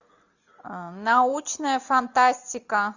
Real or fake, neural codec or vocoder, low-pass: real; none; 7.2 kHz